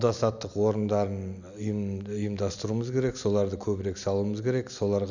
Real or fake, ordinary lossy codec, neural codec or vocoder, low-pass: real; none; none; 7.2 kHz